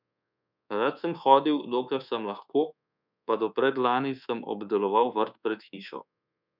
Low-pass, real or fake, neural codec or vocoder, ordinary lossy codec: 5.4 kHz; fake; codec, 24 kHz, 1.2 kbps, DualCodec; none